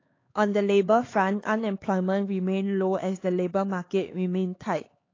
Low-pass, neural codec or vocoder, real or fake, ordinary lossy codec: 7.2 kHz; codec, 16 kHz, 4 kbps, X-Codec, HuBERT features, trained on LibriSpeech; fake; AAC, 32 kbps